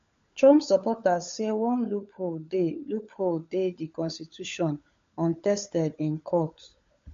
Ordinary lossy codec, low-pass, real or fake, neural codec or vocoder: MP3, 48 kbps; 7.2 kHz; fake; codec, 16 kHz, 16 kbps, FunCodec, trained on LibriTTS, 50 frames a second